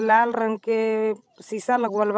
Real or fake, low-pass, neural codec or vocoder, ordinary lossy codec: fake; none; codec, 16 kHz, 16 kbps, FreqCodec, larger model; none